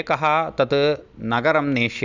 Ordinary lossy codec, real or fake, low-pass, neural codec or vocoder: none; real; 7.2 kHz; none